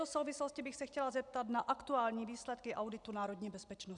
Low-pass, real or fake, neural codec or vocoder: 10.8 kHz; real; none